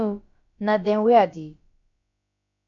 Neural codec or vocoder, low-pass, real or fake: codec, 16 kHz, about 1 kbps, DyCAST, with the encoder's durations; 7.2 kHz; fake